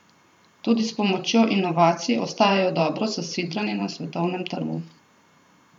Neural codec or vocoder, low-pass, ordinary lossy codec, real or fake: vocoder, 44.1 kHz, 128 mel bands every 512 samples, BigVGAN v2; 19.8 kHz; none; fake